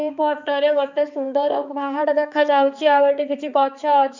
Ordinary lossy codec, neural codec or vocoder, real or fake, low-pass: none; codec, 16 kHz, 4 kbps, X-Codec, HuBERT features, trained on balanced general audio; fake; 7.2 kHz